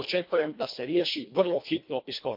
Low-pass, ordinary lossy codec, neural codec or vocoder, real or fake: 5.4 kHz; MP3, 32 kbps; codec, 24 kHz, 1.5 kbps, HILCodec; fake